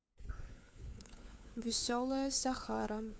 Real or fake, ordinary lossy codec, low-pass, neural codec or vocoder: fake; none; none; codec, 16 kHz, 4 kbps, FunCodec, trained on LibriTTS, 50 frames a second